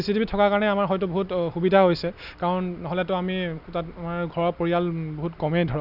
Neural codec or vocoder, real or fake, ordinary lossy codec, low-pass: none; real; none; 5.4 kHz